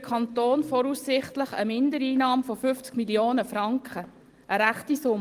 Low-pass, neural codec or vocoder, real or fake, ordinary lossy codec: 14.4 kHz; none; real; Opus, 24 kbps